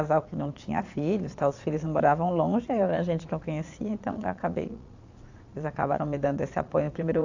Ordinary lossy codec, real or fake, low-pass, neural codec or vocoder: AAC, 48 kbps; fake; 7.2 kHz; vocoder, 22.05 kHz, 80 mel bands, Vocos